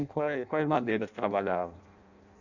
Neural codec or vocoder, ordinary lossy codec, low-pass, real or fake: codec, 16 kHz in and 24 kHz out, 0.6 kbps, FireRedTTS-2 codec; none; 7.2 kHz; fake